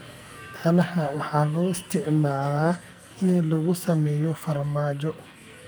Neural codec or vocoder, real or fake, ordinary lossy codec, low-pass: codec, 44.1 kHz, 2.6 kbps, SNAC; fake; none; none